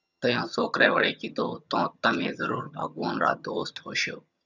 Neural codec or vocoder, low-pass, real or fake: vocoder, 22.05 kHz, 80 mel bands, HiFi-GAN; 7.2 kHz; fake